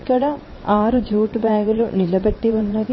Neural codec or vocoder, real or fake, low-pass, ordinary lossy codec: vocoder, 22.05 kHz, 80 mel bands, WaveNeXt; fake; 7.2 kHz; MP3, 24 kbps